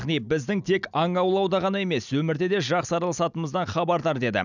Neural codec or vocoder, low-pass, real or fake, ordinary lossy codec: none; 7.2 kHz; real; none